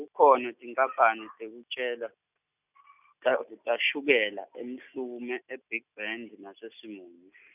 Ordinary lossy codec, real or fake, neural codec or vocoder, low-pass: none; real; none; 3.6 kHz